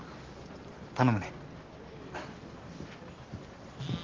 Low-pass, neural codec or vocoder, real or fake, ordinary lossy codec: 7.2 kHz; none; real; Opus, 16 kbps